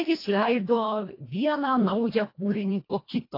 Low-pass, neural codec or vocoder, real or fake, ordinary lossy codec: 5.4 kHz; codec, 24 kHz, 1.5 kbps, HILCodec; fake; AAC, 32 kbps